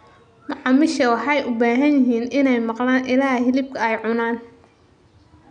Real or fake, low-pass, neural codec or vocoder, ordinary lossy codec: real; 9.9 kHz; none; none